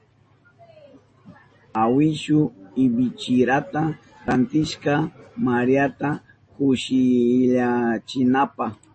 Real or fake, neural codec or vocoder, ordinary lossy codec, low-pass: real; none; MP3, 32 kbps; 10.8 kHz